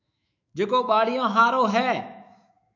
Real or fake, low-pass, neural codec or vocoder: fake; 7.2 kHz; autoencoder, 48 kHz, 128 numbers a frame, DAC-VAE, trained on Japanese speech